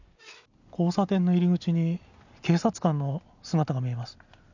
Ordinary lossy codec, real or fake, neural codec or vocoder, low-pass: none; real; none; 7.2 kHz